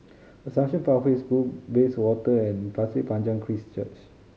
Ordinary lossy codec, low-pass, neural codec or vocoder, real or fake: none; none; none; real